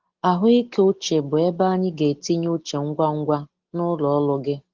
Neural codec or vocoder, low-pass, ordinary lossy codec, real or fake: none; 7.2 kHz; Opus, 16 kbps; real